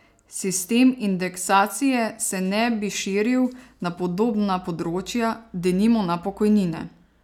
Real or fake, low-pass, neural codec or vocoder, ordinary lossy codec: real; 19.8 kHz; none; none